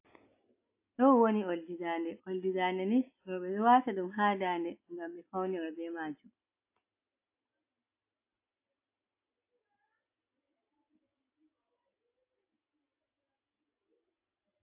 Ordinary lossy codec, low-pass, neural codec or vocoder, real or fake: AAC, 32 kbps; 3.6 kHz; none; real